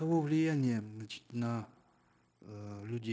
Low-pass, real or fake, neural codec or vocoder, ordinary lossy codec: none; fake; codec, 16 kHz, 0.9 kbps, LongCat-Audio-Codec; none